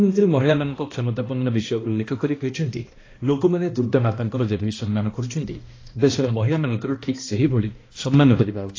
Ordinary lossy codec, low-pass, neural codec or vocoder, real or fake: AAC, 32 kbps; 7.2 kHz; codec, 16 kHz, 1 kbps, X-Codec, HuBERT features, trained on balanced general audio; fake